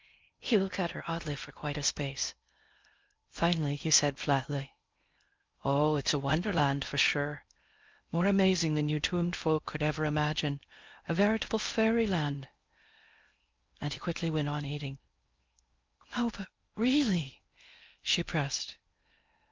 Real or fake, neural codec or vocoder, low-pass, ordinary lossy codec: fake; codec, 16 kHz in and 24 kHz out, 0.6 kbps, FocalCodec, streaming, 4096 codes; 7.2 kHz; Opus, 24 kbps